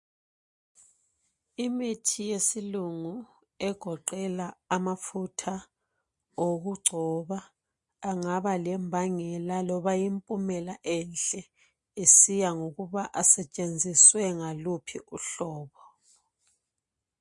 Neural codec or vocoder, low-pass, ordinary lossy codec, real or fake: none; 10.8 kHz; MP3, 48 kbps; real